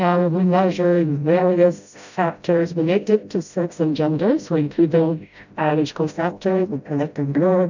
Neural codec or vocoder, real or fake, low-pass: codec, 16 kHz, 0.5 kbps, FreqCodec, smaller model; fake; 7.2 kHz